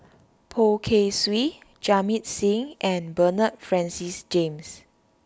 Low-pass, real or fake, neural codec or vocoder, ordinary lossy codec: none; real; none; none